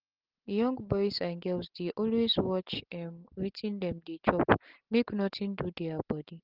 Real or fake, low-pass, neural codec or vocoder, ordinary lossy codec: real; 5.4 kHz; none; Opus, 16 kbps